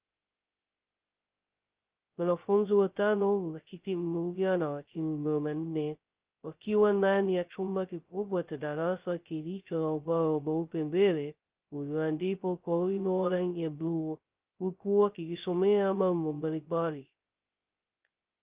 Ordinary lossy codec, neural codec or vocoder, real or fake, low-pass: Opus, 24 kbps; codec, 16 kHz, 0.2 kbps, FocalCodec; fake; 3.6 kHz